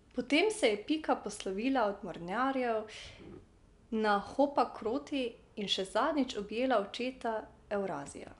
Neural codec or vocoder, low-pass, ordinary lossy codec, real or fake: none; 10.8 kHz; none; real